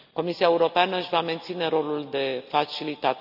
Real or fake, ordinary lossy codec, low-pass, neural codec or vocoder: real; none; 5.4 kHz; none